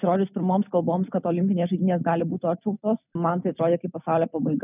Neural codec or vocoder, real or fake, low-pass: none; real; 3.6 kHz